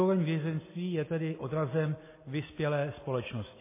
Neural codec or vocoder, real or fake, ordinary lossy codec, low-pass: none; real; MP3, 16 kbps; 3.6 kHz